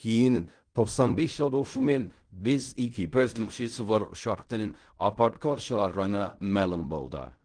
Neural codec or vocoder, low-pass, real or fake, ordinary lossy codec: codec, 16 kHz in and 24 kHz out, 0.4 kbps, LongCat-Audio-Codec, fine tuned four codebook decoder; 9.9 kHz; fake; Opus, 24 kbps